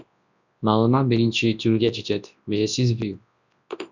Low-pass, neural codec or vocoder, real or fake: 7.2 kHz; codec, 24 kHz, 0.9 kbps, WavTokenizer, large speech release; fake